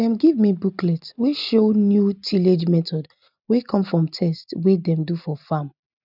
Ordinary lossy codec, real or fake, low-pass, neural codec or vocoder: none; real; 5.4 kHz; none